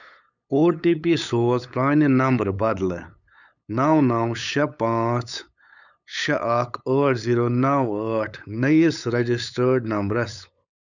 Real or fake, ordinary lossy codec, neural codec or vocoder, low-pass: fake; none; codec, 16 kHz, 8 kbps, FunCodec, trained on LibriTTS, 25 frames a second; 7.2 kHz